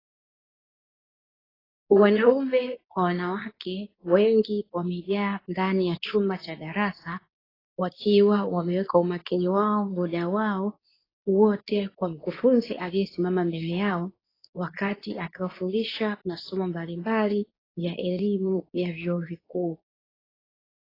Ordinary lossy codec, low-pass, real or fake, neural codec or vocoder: AAC, 24 kbps; 5.4 kHz; fake; codec, 24 kHz, 0.9 kbps, WavTokenizer, medium speech release version 2